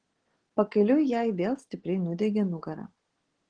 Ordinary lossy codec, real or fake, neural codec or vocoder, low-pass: Opus, 16 kbps; real; none; 9.9 kHz